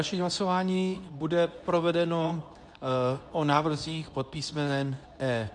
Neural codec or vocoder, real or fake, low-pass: codec, 24 kHz, 0.9 kbps, WavTokenizer, medium speech release version 2; fake; 10.8 kHz